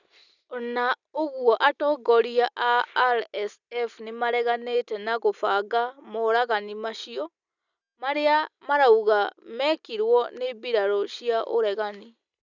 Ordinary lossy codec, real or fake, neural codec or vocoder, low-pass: none; real; none; 7.2 kHz